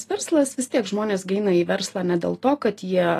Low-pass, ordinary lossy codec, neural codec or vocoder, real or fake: 14.4 kHz; AAC, 48 kbps; none; real